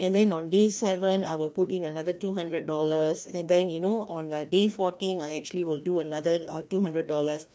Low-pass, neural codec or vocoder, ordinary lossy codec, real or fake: none; codec, 16 kHz, 1 kbps, FreqCodec, larger model; none; fake